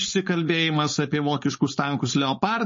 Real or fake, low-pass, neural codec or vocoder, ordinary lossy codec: fake; 7.2 kHz; codec, 16 kHz, 4.8 kbps, FACodec; MP3, 32 kbps